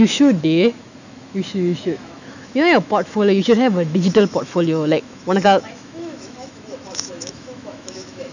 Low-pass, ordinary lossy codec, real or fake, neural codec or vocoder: 7.2 kHz; none; fake; autoencoder, 48 kHz, 128 numbers a frame, DAC-VAE, trained on Japanese speech